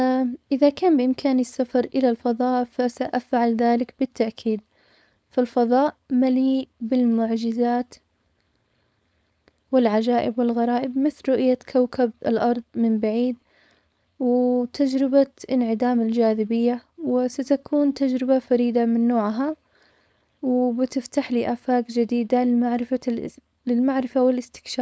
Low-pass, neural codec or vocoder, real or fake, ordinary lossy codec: none; codec, 16 kHz, 4.8 kbps, FACodec; fake; none